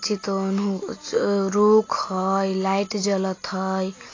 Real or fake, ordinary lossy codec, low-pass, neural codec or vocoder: real; AAC, 32 kbps; 7.2 kHz; none